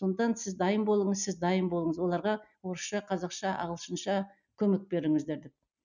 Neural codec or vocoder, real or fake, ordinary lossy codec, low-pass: none; real; none; 7.2 kHz